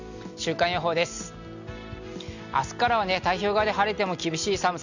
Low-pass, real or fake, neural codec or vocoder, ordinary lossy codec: 7.2 kHz; real; none; none